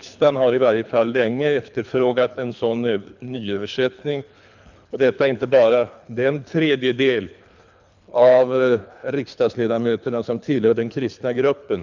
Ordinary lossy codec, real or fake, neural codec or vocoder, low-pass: none; fake; codec, 24 kHz, 3 kbps, HILCodec; 7.2 kHz